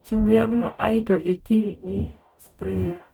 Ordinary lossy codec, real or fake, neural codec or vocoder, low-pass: none; fake; codec, 44.1 kHz, 0.9 kbps, DAC; 19.8 kHz